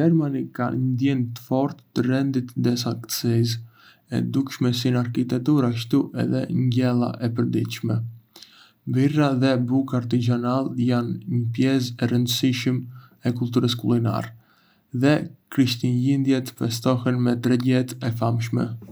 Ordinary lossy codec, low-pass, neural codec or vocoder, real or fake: none; none; none; real